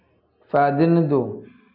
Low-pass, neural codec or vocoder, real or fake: 5.4 kHz; none; real